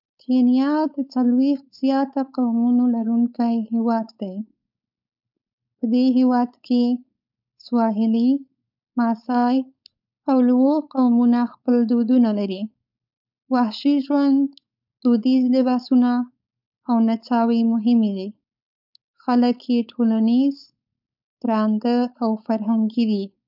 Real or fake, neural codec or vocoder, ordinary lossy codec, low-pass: fake; codec, 16 kHz, 4.8 kbps, FACodec; none; 5.4 kHz